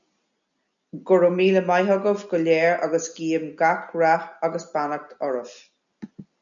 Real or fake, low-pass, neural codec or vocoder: real; 7.2 kHz; none